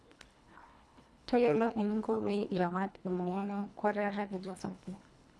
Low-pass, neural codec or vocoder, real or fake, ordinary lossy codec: none; codec, 24 kHz, 1.5 kbps, HILCodec; fake; none